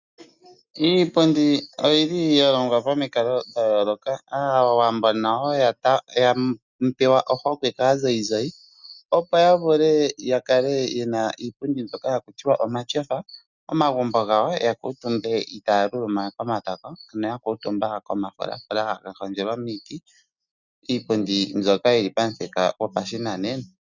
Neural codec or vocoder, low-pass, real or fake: none; 7.2 kHz; real